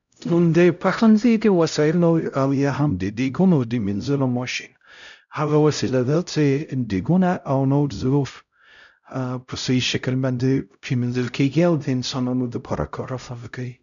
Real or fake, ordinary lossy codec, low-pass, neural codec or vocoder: fake; none; 7.2 kHz; codec, 16 kHz, 0.5 kbps, X-Codec, HuBERT features, trained on LibriSpeech